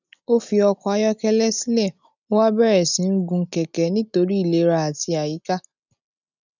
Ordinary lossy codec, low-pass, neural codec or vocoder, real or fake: none; 7.2 kHz; none; real